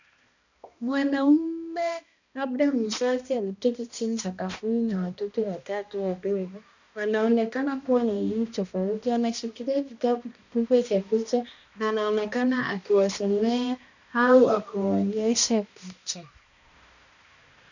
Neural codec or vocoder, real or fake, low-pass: codec, 16 kHz, 1 kbps, X-Codec, HuBERT features, trained on balanced general audio; fake; 7.2 kHz